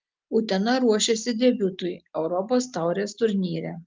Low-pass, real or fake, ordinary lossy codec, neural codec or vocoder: 7.2 kHz; real; Opus, 24 kbps; none